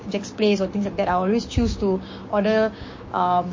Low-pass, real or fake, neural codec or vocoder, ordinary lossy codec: 7.2 kHz; fake; codec, 16 kHz, 6 kbps, DAC; MP3, 32 kbps